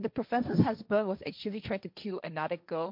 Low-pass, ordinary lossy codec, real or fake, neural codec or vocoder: 5.4 kHz; MP3, 48 kbps; fake; codec, 16 kHz, 1.1 kbps, Voila-Tokenizer